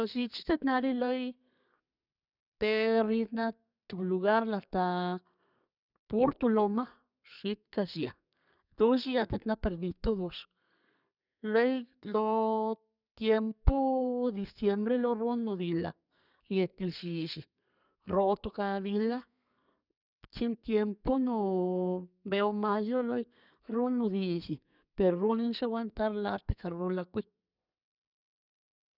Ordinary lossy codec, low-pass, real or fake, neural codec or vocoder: none; 5.4 kHz; fake; codec, 32 kHz, 1.9 kbps, SNAC